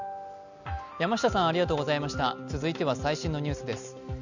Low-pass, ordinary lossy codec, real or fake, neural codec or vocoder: 7.2 kHz; none; real; none